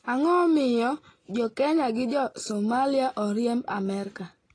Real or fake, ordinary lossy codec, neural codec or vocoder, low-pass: real; AAC, 32 kbps; none; 9.9 kHz